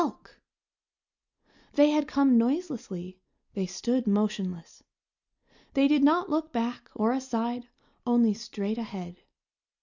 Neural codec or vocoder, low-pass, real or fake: none; 7.2 kHz; real